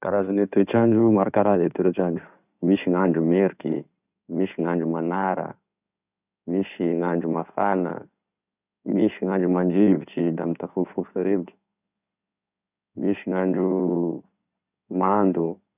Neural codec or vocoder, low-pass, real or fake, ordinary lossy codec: codec, 16 kHz in and 24 kHz out, 2.2 kbps, FireRedTTS-2 codec; 3.6 kHz; fake; none